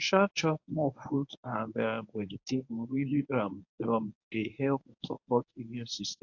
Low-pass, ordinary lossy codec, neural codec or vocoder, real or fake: 7.2 kHz; AAC, 48 kbps; codec, 24 kHz, 0.9 kbps, WavTokenizer, medium speech release version 1; fake